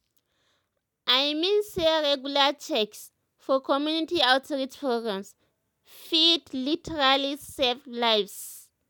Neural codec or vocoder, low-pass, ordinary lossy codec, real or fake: none; 19.8 kHz; none; real